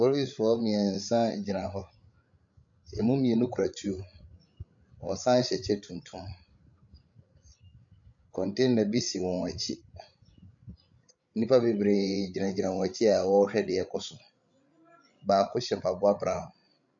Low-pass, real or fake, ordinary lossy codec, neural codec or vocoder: 7.2 kHz; fake; AAC, 64 kbps; codec, 16 kHz, 16 kbps, FreqCodec, larger model